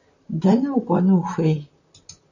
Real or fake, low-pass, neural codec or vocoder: fake; 7.2 kHz; vocoder, 44.1 kHz, 128 mel bands, Pupu-Vocoder